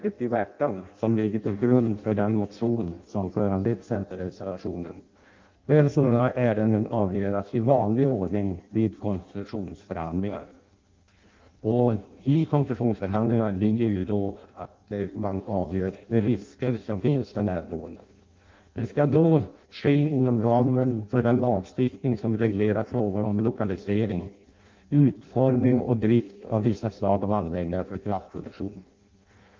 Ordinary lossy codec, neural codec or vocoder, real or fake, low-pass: Opus, 24 kbps; codec, 16 kHz in and 24 kHz out, 0.6 kbps, FireRedTTS-2 codec; fake; 7.2 kHz